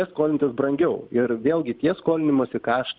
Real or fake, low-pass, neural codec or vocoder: real; 5.4 kHz; none